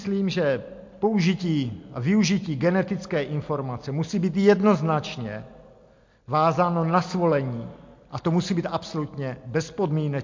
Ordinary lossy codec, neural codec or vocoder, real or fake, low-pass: MP3, 48 kbps; none; real; 7.2 kHz